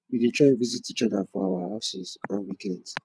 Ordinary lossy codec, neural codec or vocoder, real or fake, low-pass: none; vocoder, 22.05 kHz, 80 mel bands, WaveNeXt; fake; none